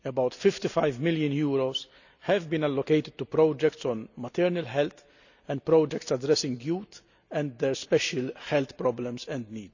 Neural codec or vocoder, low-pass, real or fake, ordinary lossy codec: none; 7.2 kHz; real; none